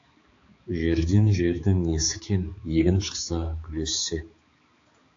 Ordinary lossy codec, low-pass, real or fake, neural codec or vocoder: AAC, 48 kbps; 7.2 kHz; fake; codec, 16 kHz, 4 kbps, X-Codec, HuBERT features, trained on balanced general audio